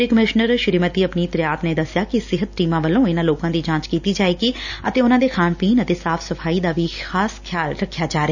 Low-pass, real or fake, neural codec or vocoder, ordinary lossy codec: 7.2 kHz; real; none; none